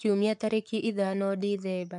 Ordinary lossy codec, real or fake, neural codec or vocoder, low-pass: none; fake; codec, 44.1 kHz, 7.8 kbps, Pupu-Codec; 10.8 kHz